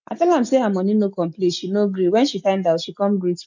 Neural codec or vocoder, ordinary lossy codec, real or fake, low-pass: none; MP3, 64 kbps; real; 7.2 kHz